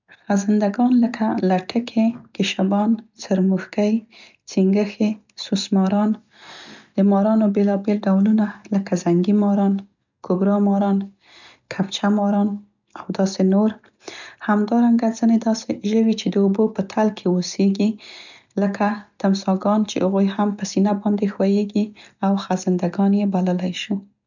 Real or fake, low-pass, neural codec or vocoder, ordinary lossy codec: real; 7.2 kHz; none; none